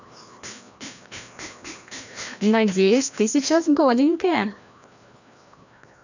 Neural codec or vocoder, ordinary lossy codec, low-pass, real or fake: codec, 16 kHz, 1 kbps, FreqCodec, larger model; none; 7.2 kHz; fake